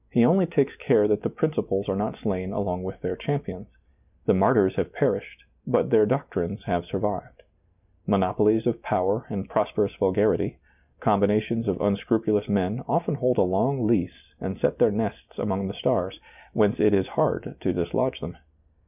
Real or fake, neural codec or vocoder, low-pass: real; none; 3.6 kHz